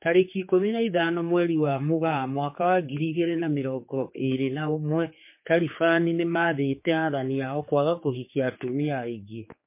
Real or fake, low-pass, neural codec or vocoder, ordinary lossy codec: fake; 3.6 kHz; codec, 16 kHz, 4 kbps, X-Codec, HuBERT features, trained on general audio; MP3, 24 kbps